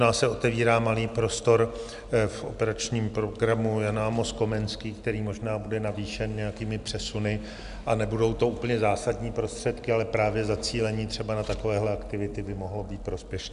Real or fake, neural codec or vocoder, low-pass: real; none; 10.8 kHz